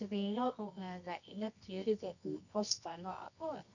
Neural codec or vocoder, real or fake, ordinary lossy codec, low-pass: codec, 24 kHz, 0.9 kbps, WavTokenizer, medium music audio release; fake; none; 7.2 kHz